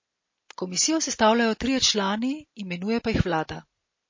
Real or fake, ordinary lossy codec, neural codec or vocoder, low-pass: real; MP3, 32 kbps; none; 7.2 kHz